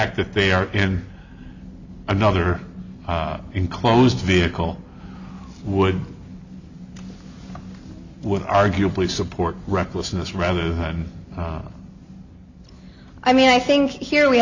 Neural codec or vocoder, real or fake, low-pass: none; real; 7.2 kHz